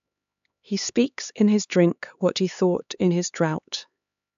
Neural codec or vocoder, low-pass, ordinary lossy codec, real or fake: codec, 16 kHz, 4 kbps, X-Codec, HuBERT features, trained on LibriSpeech; 7.2 kHz; AAC, 96 kbps; fake